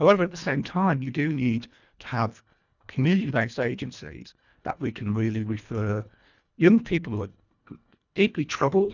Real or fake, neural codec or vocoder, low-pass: fake; codec, 24 kHz, 1.5 kbps, HILCodec; 7.2 kHz